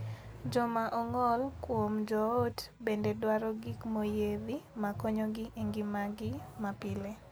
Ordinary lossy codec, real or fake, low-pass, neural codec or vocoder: none; real; none; none